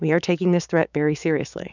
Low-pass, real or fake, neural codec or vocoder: 7.2 kHz; fake; codec, 16 kHz, 6 kbps, DAC